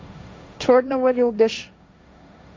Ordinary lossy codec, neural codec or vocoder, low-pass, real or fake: none; codec, 16 kHz, 1.1 kbps, Voila-Tokenizer; none; fake